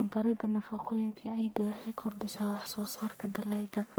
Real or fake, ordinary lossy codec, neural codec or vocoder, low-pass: fake; none; codec, 44.1 kHz, 1.7 kbps, Pupu-Codec; none